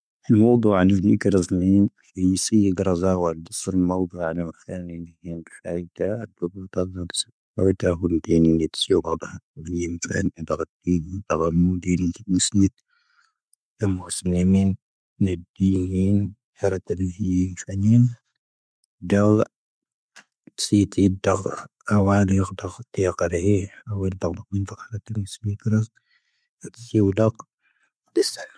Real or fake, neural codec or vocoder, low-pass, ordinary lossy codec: real; none; none; none